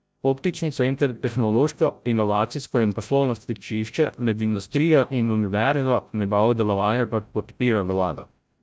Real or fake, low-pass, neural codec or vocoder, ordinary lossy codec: fake; none; codec, 16 kHz, 0.5 kbps, FreqCodec, larger model; none